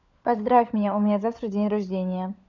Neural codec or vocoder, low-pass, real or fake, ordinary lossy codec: codec, 16 kHz, 16 kbps, FunCodec, trained on LibriTTS, 50 frames a second; 7.2 kHz; fake; none